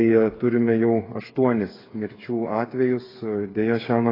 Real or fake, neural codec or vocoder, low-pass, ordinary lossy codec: fake; codec, 16 kHz, 8 kbps, FreqCodec, smaller model; 5.4 kHz; AAC, 24 kbps